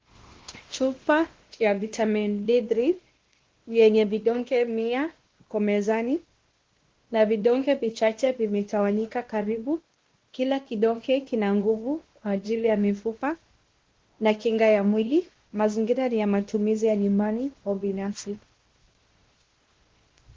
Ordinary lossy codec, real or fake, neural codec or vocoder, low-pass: Opus, 16 kbps; fake; codec, 16 kHz, 1 kbps, X-Codec, WavLM features, trained on Multilingual LibriSpeech; 7.2 kHz